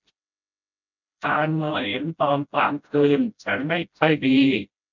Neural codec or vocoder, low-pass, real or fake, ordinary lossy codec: codec, 16 kHz, 0.5 kbps, FreqCodec, smaller model; 7.2 kHz; fake; none